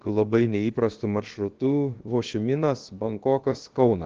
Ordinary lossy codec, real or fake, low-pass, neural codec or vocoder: Opus, 16 kbps; fake; 7.2 kHz; codec, 16 kHz, about 1 kbps, DyCAST, with the encoder's durations